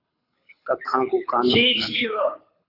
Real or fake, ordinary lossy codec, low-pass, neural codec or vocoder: fake; AAC, 24 kbps; 5.4 kHz; codec, 24 kHz, 6 kbps, HILCodec